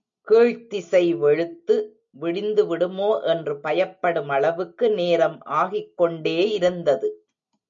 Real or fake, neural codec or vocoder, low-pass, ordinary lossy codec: real; none; 7.2 kHz; MP3, 64 kbps